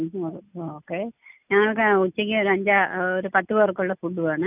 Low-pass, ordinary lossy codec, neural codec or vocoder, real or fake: 3.6 kHz; none; none; real